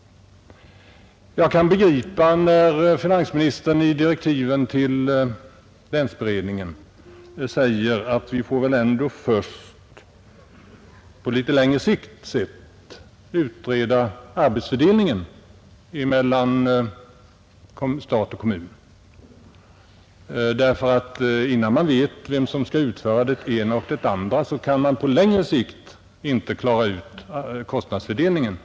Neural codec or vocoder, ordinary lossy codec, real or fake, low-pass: none; none; real; none